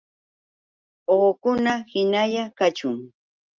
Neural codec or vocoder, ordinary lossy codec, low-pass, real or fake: none; Opus, 24 kbps; 7.2 kHz; real